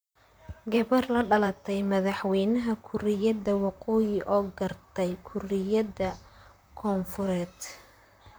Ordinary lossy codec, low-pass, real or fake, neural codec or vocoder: none; none; fake; vocoder, 44.1 kHz, 128 mel bands, Pupu-Vocoder